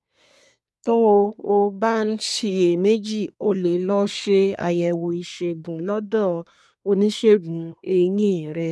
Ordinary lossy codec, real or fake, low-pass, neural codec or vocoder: none; fake; none; codec, 24 kHz, 1 kbps, SNAC